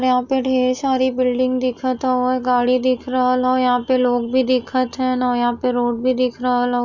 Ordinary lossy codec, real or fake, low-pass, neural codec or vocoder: none; real; 7.2 kHz; none